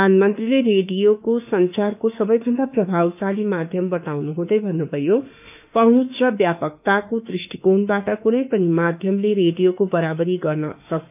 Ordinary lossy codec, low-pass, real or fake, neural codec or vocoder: none; 3.6 kHz; fake; autoencoder, 48 kHz, 32 numbers a frame, DAC-VAE, trained on Japanese speech